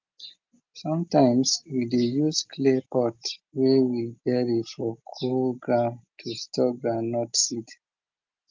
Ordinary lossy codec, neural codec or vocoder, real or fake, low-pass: Opus, 24 kbps; none; real; 7.2 kHz